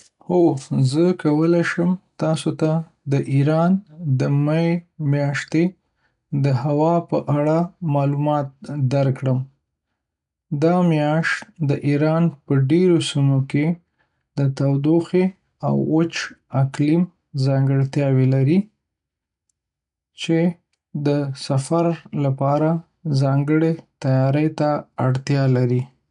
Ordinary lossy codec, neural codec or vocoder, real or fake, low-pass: none; none; real; 10.8 kHz